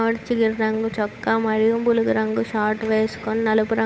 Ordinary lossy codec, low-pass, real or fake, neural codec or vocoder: none; none; fake; codec, 16 kHz, 8 kbps, FunCodec, trained on Chinese and English, 25 frames a second